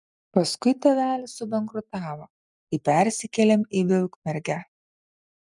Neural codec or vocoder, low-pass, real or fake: none; 10.8 kHz; real